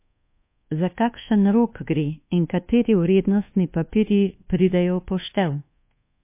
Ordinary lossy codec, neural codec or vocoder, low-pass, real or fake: MP3, 24 kbps; codec, 24 kHz, 1.2 kbps, DualCodec; 3.6 kHz; fake